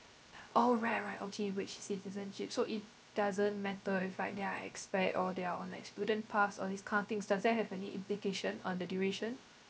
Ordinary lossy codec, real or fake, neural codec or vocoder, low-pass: none; fake; codec, 16 kHz, 0.3 kbps, FocalCodec; none